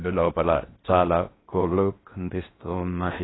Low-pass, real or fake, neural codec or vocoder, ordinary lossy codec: 7.2 kHz; fake; codec, 16 kHz in and 24 kHz out, 0.6 kbps, FocalCodec, streaming, 4096 codes; AAC, 16 kbps